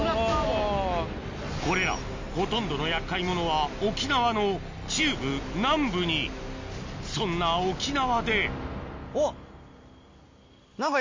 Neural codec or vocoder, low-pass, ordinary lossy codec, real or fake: none; 7.2 kHz; MP3, 48 kbps; real